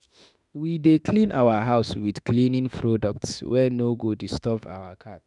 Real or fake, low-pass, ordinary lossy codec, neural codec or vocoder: fake; 10.8 kHz; none; autoencoder, 48 kHz, 32 numbers a frame, DAC-VAE, trained on Japanese speech